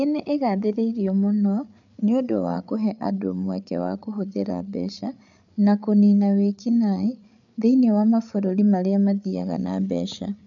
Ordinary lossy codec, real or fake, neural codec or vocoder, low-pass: none; fake; codec, 16 kHz, 8 kbps, FreqCodec, larger model; 7.2 kHz